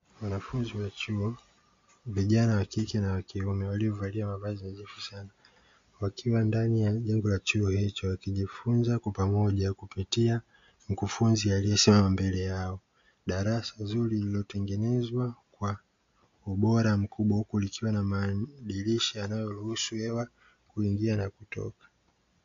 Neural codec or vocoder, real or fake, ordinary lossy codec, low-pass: none; real; MP3, 48 kbps; 7.2 kHz